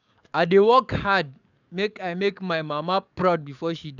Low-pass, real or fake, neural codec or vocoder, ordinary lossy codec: 7.2 kHz; fake; codec, 44.1 kHz, 7.8 kbps, DAC; none